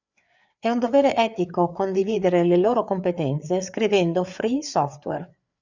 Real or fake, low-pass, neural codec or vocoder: fake; 7.2 kHz; codec, 16 kHz, 4 kbps, FreqCodec, larger model